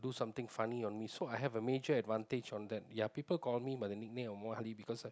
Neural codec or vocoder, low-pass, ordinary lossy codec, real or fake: none; none; none; real